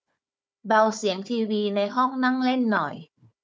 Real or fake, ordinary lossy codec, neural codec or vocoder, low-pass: fake; none; codec, 16 kHz, 4 kbps, FunCodec, trained on Chinese and English, 50 frames a second; none